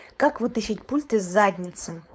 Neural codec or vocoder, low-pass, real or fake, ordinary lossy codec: codec, 16 kHz, 4.8 kbps, FACodec; none; fake; none